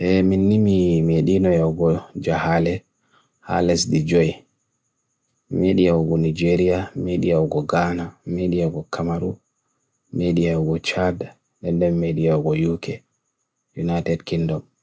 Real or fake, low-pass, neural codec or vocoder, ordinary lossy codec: real; none; none; none